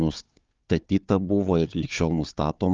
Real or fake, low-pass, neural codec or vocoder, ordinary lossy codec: fake; 7.2 kHz; codec, 16 kHz, 4 kbps, FunCodec, trained on Chinese and English, 50 frames a second; Opus, 32 kbps